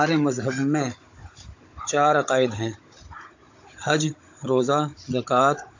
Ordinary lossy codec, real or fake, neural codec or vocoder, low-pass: AAC, 48 kbps; fake; codec, 16 kHz, 16 kbps, FunCodec, trained on Chinese and English, 50 frames a second; 7.2 kHz